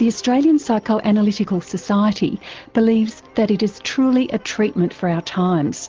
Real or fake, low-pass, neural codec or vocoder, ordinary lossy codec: real; 7.2 kHz; none; Opus, 16 kbps